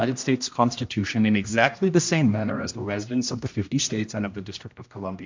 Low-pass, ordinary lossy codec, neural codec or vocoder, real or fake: 7.2 kHz; MP3, 64 kbps; codec, 16 kHz, 1 kbps, X-Codec, HuBERT features, trained on general audio; fake